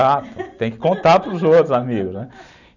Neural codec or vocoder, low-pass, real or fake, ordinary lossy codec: none; 7.2 kHz; real; none